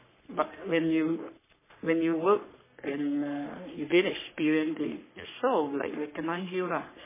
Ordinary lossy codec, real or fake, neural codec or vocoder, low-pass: MP3, 16 kbps; fake; codec, 44.1 kHz, 3.4 kbps, Pupu-Codec; 3.6 kHz